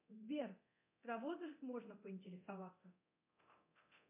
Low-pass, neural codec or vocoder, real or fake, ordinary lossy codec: 3.6 kHz; codec, 24 kHz, 0.9 kbps, DualCodec; fake; MP3, 32 kbps